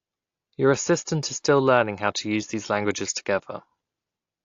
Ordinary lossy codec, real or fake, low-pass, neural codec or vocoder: AAC, 48 kbps; real; 7.2 kHz; none